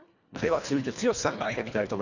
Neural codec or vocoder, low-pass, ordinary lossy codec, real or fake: codec, 24 kHz, 1.5 kbps, HILCodec; 7.2 kHz; none; fake